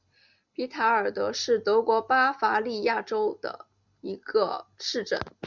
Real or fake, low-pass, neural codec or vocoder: real; 7.2 kHz; none